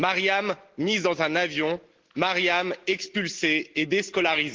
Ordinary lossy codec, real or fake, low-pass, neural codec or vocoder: Opus, 16 kbps; real; 7.2 kHz; none